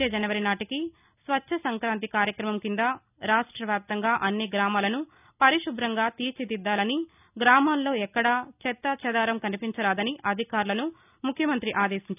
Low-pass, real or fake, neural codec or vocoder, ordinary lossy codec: 3.6 kHz; real; none; none